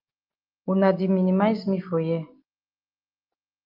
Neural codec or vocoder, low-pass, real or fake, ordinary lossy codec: none; 5.4 kHz; real; Opus, 32 kbps